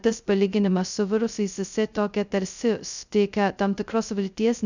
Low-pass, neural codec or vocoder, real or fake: 7.2 kHz; codec, 16 kHz, 0.2 kbps, FocalCodec; fake